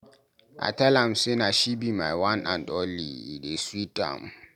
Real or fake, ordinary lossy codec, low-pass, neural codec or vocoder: real; none; 19.8 kHz; none